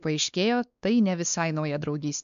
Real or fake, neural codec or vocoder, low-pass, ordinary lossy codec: fake; codec, 16 kHz, 2 kbps, X-Codec, WavLM features, trained on Multilingual LibriSpeech; 7.2 kHz; MP3, 96 kbps